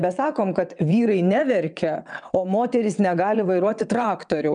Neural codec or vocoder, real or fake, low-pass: vocoder, 22.05 kHz, 80 mel bands, WaveNeXt; fake; 9.9 kHz